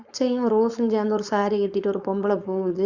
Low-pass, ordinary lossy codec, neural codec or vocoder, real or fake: 7.2 kHz; Opus, 64 kbps; codec, 16 kHz, 4.8 kbps, FACodec; fake